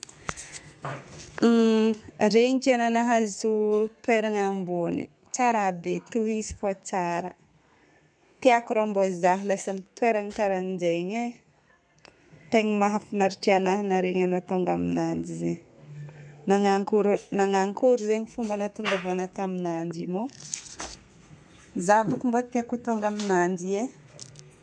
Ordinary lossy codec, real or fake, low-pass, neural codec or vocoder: none; fake; 9.9 kHz; codec, 32 kHz, 1.9 kbps, SNAC